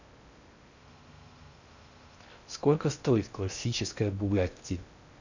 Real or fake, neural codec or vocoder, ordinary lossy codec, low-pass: fake; codec, 16 kHz in and 24 kHz out, 0.6 kbps, FocalCodec, streaming, 2048 codes; none; 7.2 kHz